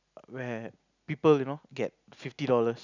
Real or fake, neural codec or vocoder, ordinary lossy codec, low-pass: real; none; none; 7.2 kHz